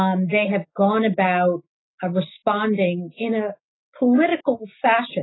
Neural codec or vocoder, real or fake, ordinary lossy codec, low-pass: none; real; AAC, 16 kbps; 7.2 kHz